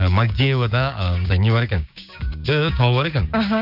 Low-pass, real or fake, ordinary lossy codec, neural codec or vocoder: 5.4 kHz; fake; none; codec, 16 kHz, 6 kbps, DAC